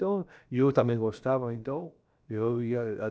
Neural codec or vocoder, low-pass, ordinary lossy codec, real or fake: codec, 16 kHz, about 1 kbps, DyCAST, with the encoder's durations; none; none; fake